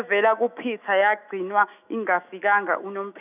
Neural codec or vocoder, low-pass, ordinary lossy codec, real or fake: autoencoder, 48 kHz, 128 numbers a frame, DAC-VAE, trained on Japanese speech; 3.6 kHz; none; fake